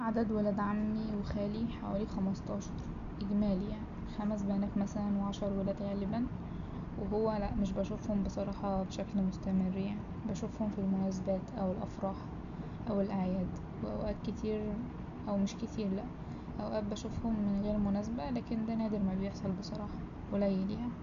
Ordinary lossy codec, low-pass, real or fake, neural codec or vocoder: none; 7.2 kHz; real; none